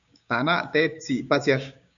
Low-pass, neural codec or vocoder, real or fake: 7.2 kHz; codec, 16 kHz, 6 kbps, DAC; fake